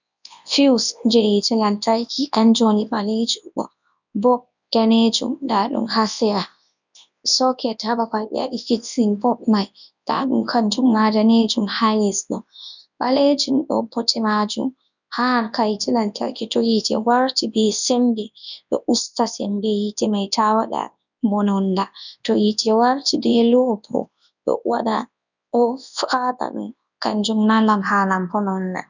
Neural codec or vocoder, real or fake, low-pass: codec, 24 kHz, 0.9 kbps, WavTokenizer, large speech release; fake; 7.2 kHz